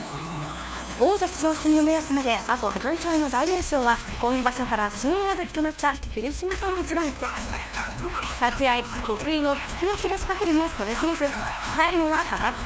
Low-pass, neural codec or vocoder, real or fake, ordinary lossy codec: none; codec, 16 kHz, 1 kbps, FunCodec, trained on LibriTTS, 50 frames a second; fake; none